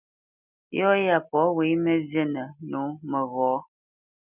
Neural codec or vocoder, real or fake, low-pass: none; real; 3.6 kHz